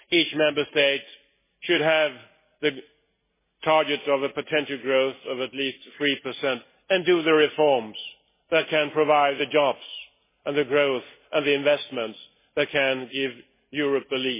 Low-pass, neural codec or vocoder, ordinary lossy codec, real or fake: 3.6 kHz; none; MP3, 16 kbps; real